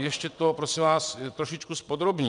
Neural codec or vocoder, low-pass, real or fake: vocoder, 22.05 kHz, 80 mel bands, WaveNeXt; 9.9 kHz; fake